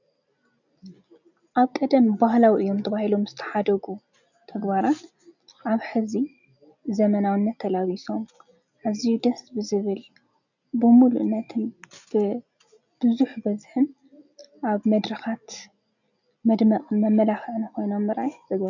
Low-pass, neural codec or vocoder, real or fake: 7.2 kHz; none; real